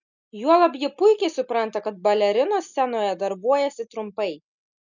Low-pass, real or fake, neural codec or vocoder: 7.2 kHz; real; none